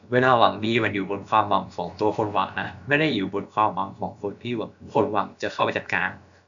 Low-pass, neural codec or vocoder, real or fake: 7.2 kHz; codec, 16 kHz, about 1 kbps, DyCAST, with the encoder's durations; fake